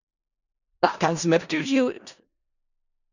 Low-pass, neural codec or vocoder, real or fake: 7.2 kHz; codec, 16 kHz in and 24 kHz out, 0.4 kbps, LongCat-Audio-Codec, four codebook decoder; fake